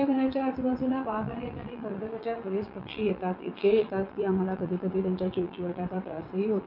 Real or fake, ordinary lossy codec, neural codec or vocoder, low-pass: fake; none; vocoder, 22.05 kHz, 80 mel bands, WaveNeXt; 5.4 kHz